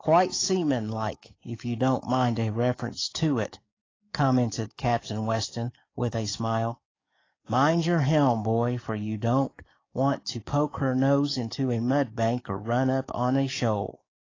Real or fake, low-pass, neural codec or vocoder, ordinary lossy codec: fake; 7.2 kHz; codec, 44.1 kHz, 7.8 kbps, DAC; AAC, 32 kbps